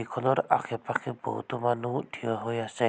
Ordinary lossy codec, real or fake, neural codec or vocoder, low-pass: none; real; none; none